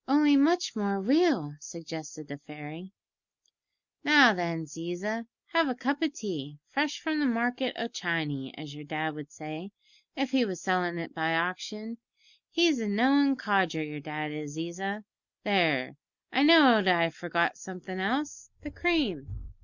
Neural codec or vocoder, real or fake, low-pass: none; real; 7.2 kHz